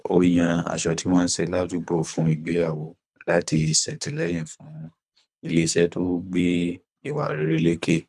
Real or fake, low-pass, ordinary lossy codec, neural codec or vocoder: fake; none; none; codec, 24 kHz, 3 kbps, HILCodec